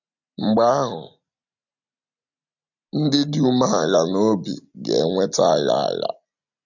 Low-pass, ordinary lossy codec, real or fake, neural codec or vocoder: 7.2 kHz; none; real; none